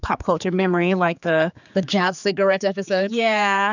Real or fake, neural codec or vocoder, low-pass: fake; codec, 16 kHz, 4 kbps, X-Codec, HuBERT features, trained on general audio; 7.2 kHz